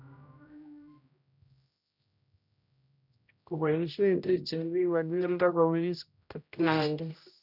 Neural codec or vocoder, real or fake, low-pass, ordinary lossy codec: codec, 16 kHz, 0.5 kbps, X-Codec, HuBERT features, trained on general audio; fake; 5.4 kHz; none